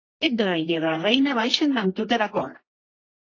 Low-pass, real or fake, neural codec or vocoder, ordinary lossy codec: 7.2 kHz; fake; codec, 24 kHz, 0.9 kbps, WavTokenizer, medium music audio release; AAC, 32 kbps